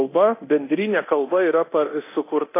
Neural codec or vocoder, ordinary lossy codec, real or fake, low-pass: codec, 24 kHz, 0.9 kbps, DualCodec; AAC, 24 kbps; fake; 3.6 kHz